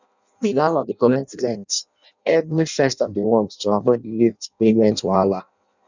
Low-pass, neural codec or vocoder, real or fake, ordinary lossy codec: 7.2 kHz; codec, 16 kHz in and 24 kHz out, 0.6 kbps, FireRedTTS-2 codec; fake; none